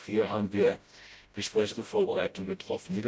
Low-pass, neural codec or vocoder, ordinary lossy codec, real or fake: none; codec, 16 kHz, 0.5 kbps, FreqCodec, smaller model; none; fake